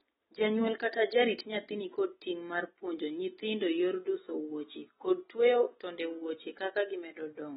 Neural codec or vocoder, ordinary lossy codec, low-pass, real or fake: none; AAC, 16 kbps; 19.8 kHz; real